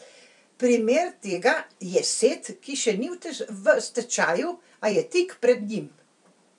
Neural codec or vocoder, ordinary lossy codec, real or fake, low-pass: vocoder, 44.1 kHz, 128 mel bands every 256 samples, BigVGAN v2; none; fake; 10.8 kHz